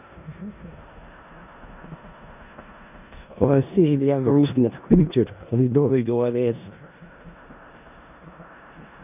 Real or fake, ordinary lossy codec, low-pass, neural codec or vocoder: fake; none; 3.6 kHz; codec, 16 kHz in and 24 kHz out, 0.4 kbps, LongCat-Audio-Codec, four codebook decoder